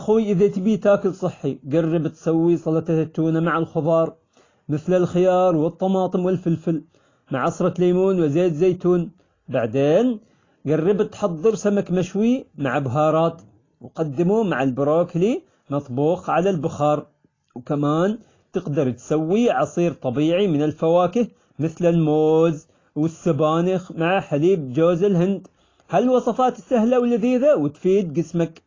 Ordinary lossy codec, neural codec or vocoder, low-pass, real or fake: AAC, 32 kbps; none; 7.2 kHz; real